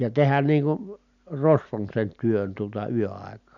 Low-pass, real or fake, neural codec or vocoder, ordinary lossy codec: 7.2 kHz; real; none; none